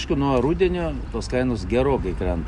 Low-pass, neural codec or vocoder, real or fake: 10.8 kHz; none; real